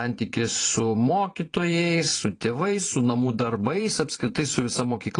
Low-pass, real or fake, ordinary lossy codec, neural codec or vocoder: 9.9 kHz; fake; AAC, 32 kbps; vocoder, 22.05 kHz, 80 mel bands, Vocos